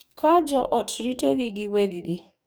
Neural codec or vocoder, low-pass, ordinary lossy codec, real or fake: codec, 44.1 kHz, 2.6 kbps, DAC; none; none; fake